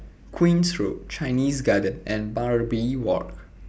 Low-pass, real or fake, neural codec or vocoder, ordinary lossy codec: none; real; none; none